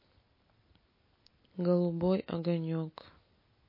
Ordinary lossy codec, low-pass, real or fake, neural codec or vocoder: MP3, 24 kbps; 5.4 kHz; real; none